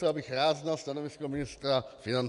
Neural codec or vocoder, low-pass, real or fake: vocoder, 24 kHz, 100 mel bands, Vocos; 10.8 kHz; fake